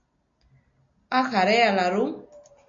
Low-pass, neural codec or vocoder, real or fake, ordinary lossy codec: 7.2 kHz; none; real; MP3, 48 kbps